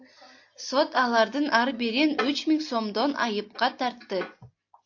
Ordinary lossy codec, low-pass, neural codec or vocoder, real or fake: AAC, 48 kbps; 7.2 kHz; none; real